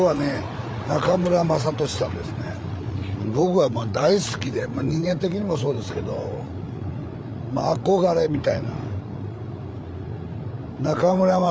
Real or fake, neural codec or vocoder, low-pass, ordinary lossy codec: fake; codec, 16 kHz, 8 kbps, FreqCodec, larger model; none; none